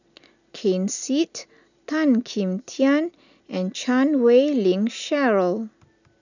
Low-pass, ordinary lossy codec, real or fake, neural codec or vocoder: 7.2 kHz; none; real; none